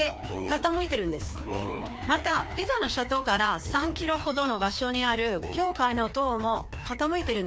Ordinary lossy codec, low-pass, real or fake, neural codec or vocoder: none; none; fake; codec, 16 kHz, 2 kbps, FreqCodec, larger model